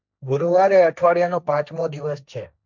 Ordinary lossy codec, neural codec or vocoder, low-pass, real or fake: none; codec, 16 kHz, 1.1 kbps, Voila-Tokenizer; 7.2 kHz; fake